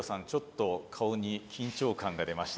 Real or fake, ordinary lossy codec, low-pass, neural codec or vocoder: real; none; none; none